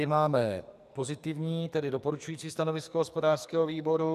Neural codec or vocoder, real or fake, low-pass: codec, 44.1 kHz, 2.6 kbps, SNAC; fake; 14.4 kHz